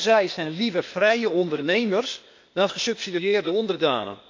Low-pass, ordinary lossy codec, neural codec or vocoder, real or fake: 7.2 kHz; MP3, 48 kbps; codec, 16 kHz, 0.8 kbps, ZipCodec; fake